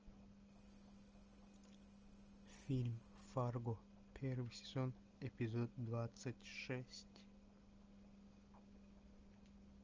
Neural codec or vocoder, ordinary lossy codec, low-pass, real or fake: none; Opus, 24 kbps; 7.2 kHz; real